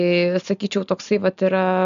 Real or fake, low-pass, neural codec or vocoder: real; 7.2 kHz; none